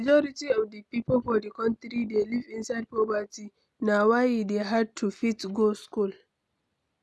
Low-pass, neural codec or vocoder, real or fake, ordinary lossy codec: none; none; real; none